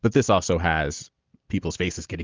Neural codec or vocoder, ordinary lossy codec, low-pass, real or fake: none; Opus, 24 kbps; 7.2 kHz; real